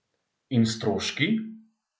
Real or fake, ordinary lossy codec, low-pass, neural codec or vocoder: real; none; none; none